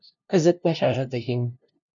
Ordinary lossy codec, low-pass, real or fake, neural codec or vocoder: MP3, 64 kbps; 7.2 kHz; fake; codec, 16 kHz, 0.5 kbps, FunCodec, trained on LibriTTS, 25 frames a second